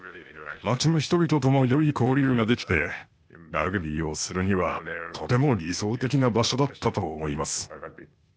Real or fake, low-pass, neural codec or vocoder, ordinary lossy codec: fake; none; codec, 16 kHz, 0.8 kbps, ZipCodec; none